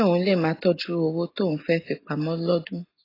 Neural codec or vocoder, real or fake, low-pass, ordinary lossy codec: none; real; 5.4 kHz; AAC, 24 kbps